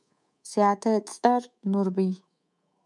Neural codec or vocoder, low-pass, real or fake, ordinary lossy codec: codec, 24 kHz, 3.1 kbps, DualCodec; 10.8 kHz; fake; MP3, 96 kbps